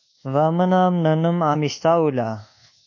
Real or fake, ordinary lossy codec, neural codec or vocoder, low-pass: fake; AAC, 48 kbps; codec, 24 kHz, 1.2 kbps, DualCodec; 7.2 kHz